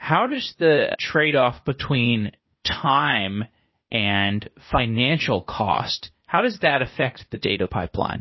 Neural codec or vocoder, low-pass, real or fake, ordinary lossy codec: codec, 16 kHz, 0.8 kbps, ZipCodec; 7.2 kHz; fake; MP3, 24 kbps